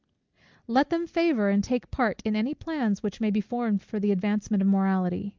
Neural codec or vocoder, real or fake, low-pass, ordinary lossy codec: none; real; 7.2 kHz; Opus, 32 kbps